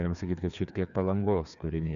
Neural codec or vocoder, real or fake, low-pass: codec, 16 kHz, 2 kbps, FreqCodec, larger model; fake; 7.2 kHz